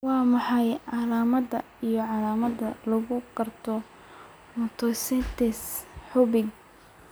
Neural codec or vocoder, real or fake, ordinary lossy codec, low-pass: none; real; none; none